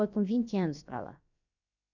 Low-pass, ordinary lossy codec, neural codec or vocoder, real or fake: 7.2 kHz; none; codec, 16 kHz, about 1 kbps, DyCAST, with the encoder's durations; fake